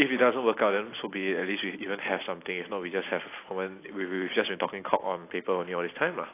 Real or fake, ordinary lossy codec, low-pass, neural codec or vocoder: real; AAC, 24 kbps; 3.6 kHz; none